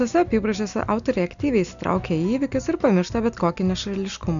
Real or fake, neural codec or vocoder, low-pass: real; none; 7.2 kHz